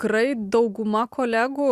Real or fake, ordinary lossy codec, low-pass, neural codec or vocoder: real; Opus, 64 kbps; 14.4 kHz; none